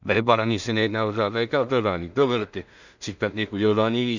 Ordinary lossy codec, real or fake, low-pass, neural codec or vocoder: none; fake; 7.2 kHz; codec, 16 kHz in and 24 kHz out, 0.4 kbps, LongCat-Audio-Codec, two codebook decoder